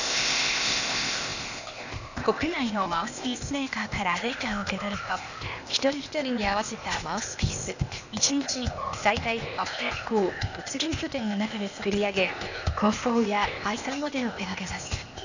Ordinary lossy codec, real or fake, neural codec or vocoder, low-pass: none; fake; codec, 16 kHz, 0.8 kbps, ZipCodec; 7.2 kHz